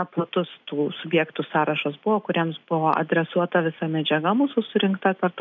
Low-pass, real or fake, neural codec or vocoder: 7.2 kHz; real; none